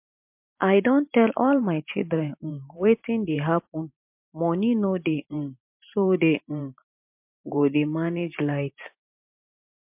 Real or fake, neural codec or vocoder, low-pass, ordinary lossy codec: fake; vocoder, 44.1 kHz, 128 mel bands every 512 samples, BigVGAN v2; 3.6 kHz; MP3, 32 kbps